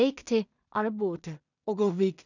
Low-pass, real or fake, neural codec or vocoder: 7.2 kHz; fake; codec, 16 kHz in and 24 kHz out, 0.4 kbps, LongCat-Audio-Codec, two codebook decoder